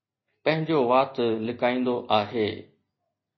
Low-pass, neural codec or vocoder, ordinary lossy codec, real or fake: 7.2 kHz; none; MP3, 24 kbps; real